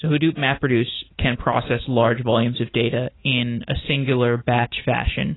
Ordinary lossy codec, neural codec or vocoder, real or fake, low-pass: AAC, 16 kbps; none; real; 7.2 kHz